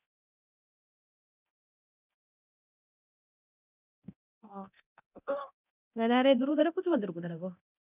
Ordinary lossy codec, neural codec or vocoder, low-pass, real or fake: none; codec, 24 kHz, 0.9 kbps, DualCodec; 3.6 kHz; fake